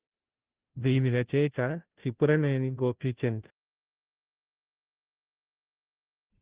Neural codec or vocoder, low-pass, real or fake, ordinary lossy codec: codec, 16 kHz, 0.5 kbps, FunCodec, trained on Chinese and English, 25 frames a second; 3.6 kHz; fake; Opus, 16 kbps